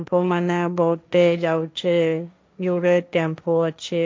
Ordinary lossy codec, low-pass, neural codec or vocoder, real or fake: none; none; codec, 16 kHz, 1.1 kbps, Voila-Tokenizer; fake